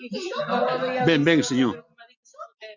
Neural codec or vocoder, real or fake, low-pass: none; real; 7.2 kHz